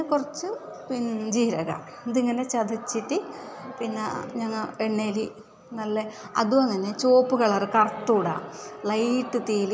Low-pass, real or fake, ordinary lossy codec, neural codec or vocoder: none; real; none; none